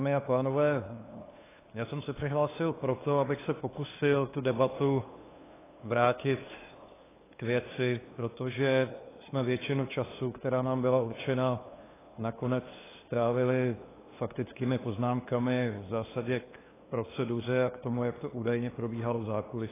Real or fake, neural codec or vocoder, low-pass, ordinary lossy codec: fake; codec, 16 kHz, 2 kbps, FunCodec, trained on LibriTTS, 25 frames a second; 3.6 kHz; AAC, 16 kbps